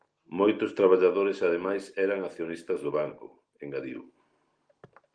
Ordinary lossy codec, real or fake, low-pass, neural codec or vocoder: Opus, 24 kbps; real; 9.9 kHz; none